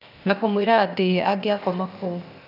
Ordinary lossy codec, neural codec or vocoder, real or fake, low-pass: none; codec, 16 kHz, 0.8 kbps, ZipCodec; fake; 5.4 kHz